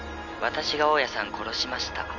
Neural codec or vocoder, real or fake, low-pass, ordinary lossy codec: none; real; 7.2 kHz; none